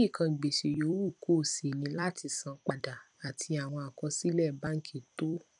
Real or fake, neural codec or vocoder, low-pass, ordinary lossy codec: fake; vocoder, 44.1 kHz, 128 mel bands every 256 samples, BigVGAN v2; 10.8 kHz; none